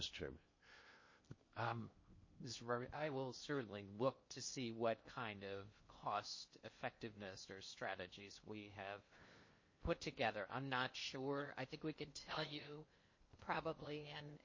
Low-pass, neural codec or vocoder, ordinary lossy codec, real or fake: 7.2 kHz; codec, 16 kHz in and 24 kHz out, 0.6 kbps, FocalCodec, streaming, 2048 codes; MP3, 32 kbps; fake